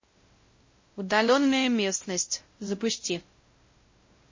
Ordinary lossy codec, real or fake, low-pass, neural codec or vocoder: MP3, 32 kbps; fake; 7.2 kHz; codec, 16 kHz, 0.5 kbps, X-Codec, WavLM features, trained on Multilingual LibriSpeech